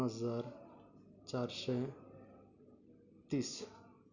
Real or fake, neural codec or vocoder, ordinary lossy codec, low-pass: real; none; none; 7.2 kHz